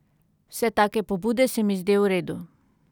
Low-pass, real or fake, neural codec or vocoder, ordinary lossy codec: 19.8 kHz; real; none; none